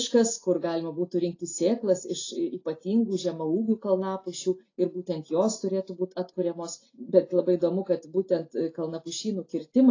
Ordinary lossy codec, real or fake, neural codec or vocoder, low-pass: AAC, 32 kbps; real; none; 7.2 kHz